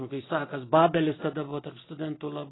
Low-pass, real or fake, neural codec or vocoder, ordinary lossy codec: 7.2 kHz; real; none; AAC, 16 kbps